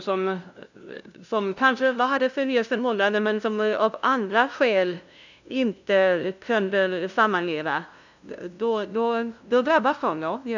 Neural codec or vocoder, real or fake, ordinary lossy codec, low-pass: codec, 16 kHz, 0.5 kbps, FunCodec, trained on LibriTTS, 25 frames a second; fake; none; 7.2 kHz